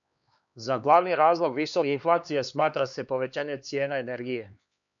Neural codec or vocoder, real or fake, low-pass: codec, 16 kHz, 2 kbps, X-Codec, HuBERT features, trained on LibriSpeech; fake; 7.2 kHz